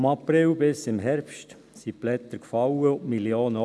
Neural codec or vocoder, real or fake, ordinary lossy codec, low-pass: none; real; none; none